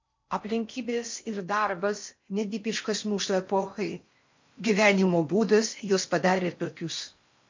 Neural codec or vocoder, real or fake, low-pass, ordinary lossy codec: codec, 16 kHz in and 24 kHz out, 0.6 kbps, FocalCodec, streaming, 4096 codes; fake; 7.2 kHz; MP3, 48 kbps